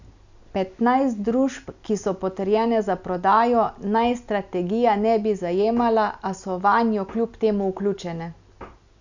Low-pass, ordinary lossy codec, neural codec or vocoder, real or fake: 7.2 kHz; none; none; real